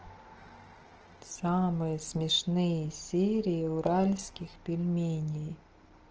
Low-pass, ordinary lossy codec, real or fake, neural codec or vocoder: 7.2 kHz; Opus, 16 kbps; real; none